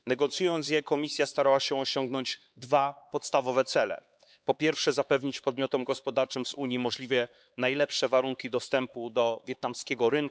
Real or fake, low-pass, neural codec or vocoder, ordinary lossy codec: fake; none; codec, 16 kHz, 4 kbps, X-Codec, HuBERT features, trained on LibriSpeech; none